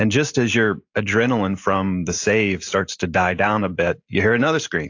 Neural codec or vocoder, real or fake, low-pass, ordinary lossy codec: none; real; 7.2 kHz; AAC, 48 kbps